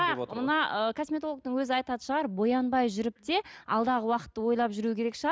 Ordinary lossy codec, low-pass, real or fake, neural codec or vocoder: none; none; real; none